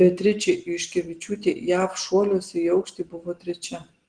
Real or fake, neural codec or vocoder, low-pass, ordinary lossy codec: real; none; 9.9 kHz; Opus, 16 kbps